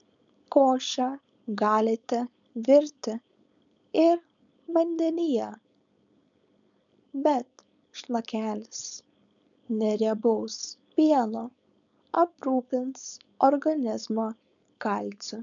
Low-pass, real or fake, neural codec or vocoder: 7.2 kHz; fake; codec, 16 kHz, 4.8 kbps, FACodec